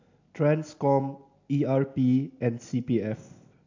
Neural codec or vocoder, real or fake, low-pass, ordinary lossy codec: none; real; 7.2 kHz; AAC, 48 kbps